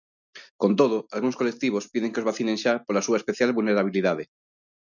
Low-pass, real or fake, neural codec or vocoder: 7.2 kHz; real; none